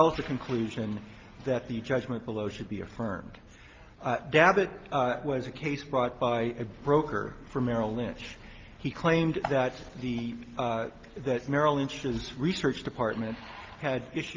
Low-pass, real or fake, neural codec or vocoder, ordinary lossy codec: 7.2 kHz; real; none; Opus, 32 kbps